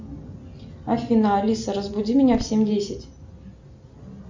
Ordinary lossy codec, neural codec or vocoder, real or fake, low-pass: MP3, 64 kbps; none; real; 7.2 kHz